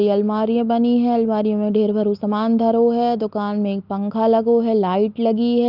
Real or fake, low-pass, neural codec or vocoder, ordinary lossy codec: real; 5.4 kHz; none; Opus, 24 kbps